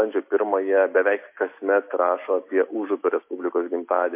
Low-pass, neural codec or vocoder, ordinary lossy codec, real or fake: 3.6 kHz; none; MP3, 24 kbps; real